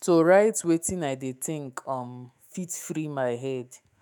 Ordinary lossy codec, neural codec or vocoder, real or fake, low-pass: none; autoencoder, 48 kHz, 128 numbers a frame, DAC-VAE, trained on Japanese speech; fake; none